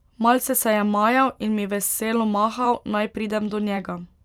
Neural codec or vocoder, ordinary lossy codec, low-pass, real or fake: vocoder, 44.1 kHz, 128 mel bands every 512 samples, BigVGAN v2; none; 19.8 kHz; fake